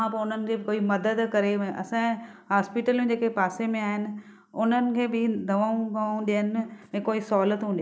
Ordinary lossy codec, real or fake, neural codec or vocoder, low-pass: none; real; none; none